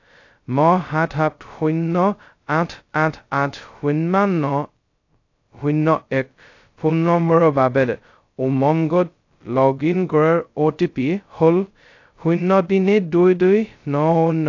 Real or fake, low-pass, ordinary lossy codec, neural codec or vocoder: fake; 7.2 kHz; AAC, 48 kbps; codec, 16 kHz, 0.2 kbps, FocalCodec